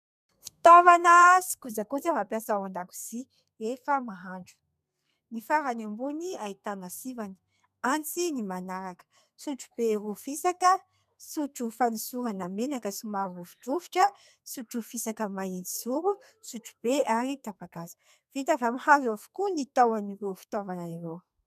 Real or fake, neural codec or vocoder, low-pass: fake; codec, 32 kHz, 1.9 kbps, SNAC; 14.4 kHz